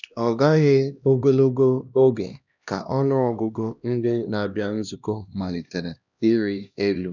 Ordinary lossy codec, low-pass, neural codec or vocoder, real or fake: none; 7.2 kHz; codec, 16 kHz, 1 kbps, X-Codec, HuBERT features, trained on LibriSpeech; fake